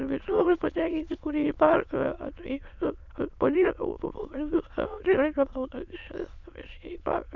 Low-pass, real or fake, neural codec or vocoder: 7.2 kHz; fake; autoencoder, 22.05 kHz, a latent of 192 numbers a frame, VITS, trained on many speakers